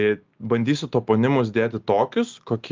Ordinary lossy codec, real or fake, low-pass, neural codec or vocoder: Opus, 32 kbps; real; 7.2 kHz; none